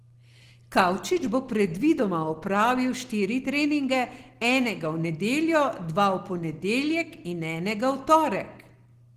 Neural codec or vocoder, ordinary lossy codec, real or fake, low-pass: none; Opus, 16 kbps; real; 14.4 kHz